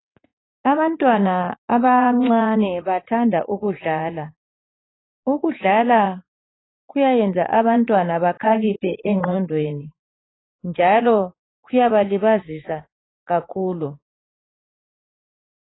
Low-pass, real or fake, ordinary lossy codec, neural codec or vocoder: 7.2 kHz; fake; AAC, 16 kbps; vocoder, 44.1 kHz, 80 mel bands, Vocos